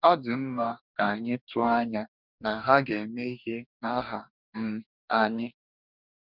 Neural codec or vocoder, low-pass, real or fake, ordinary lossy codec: codec, 44.1 kHz, 2.6 kbps, DAC; 5.4 kHz; fake; none